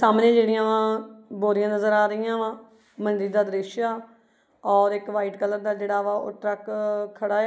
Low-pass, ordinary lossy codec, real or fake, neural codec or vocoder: none; none; real; none